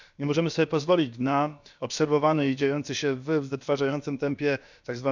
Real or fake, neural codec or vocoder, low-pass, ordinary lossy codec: fake; codec, 16 kHz, about 1 kbps, DyCAST, with the encoder's durations; 7.2 kHz; none